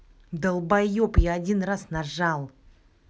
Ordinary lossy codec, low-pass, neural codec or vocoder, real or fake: none; none; none; real